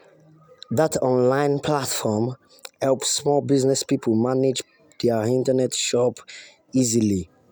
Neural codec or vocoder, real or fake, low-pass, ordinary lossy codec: none; real; none; none